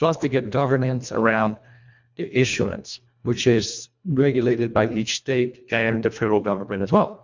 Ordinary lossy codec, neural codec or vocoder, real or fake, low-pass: MP3, 64 kbps; codec, 24 kHz, 1.5 kbps, HILCodec; fake; 7.2 kHz